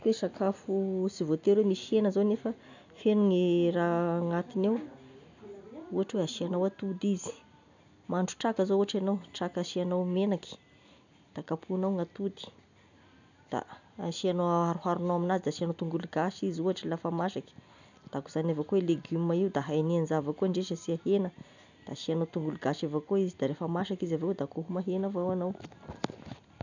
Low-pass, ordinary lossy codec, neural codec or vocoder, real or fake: 7.2 kHz; none; none; real